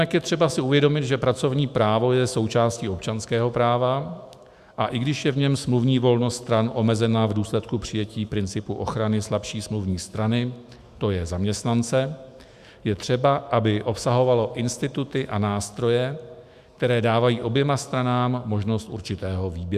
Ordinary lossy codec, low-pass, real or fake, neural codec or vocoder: Opus, 64 kbps; 14.4 kHz; fake; autoencoder, 48 kHz, 128 numbers a frame, DAC-VAE, trained on Japanese speech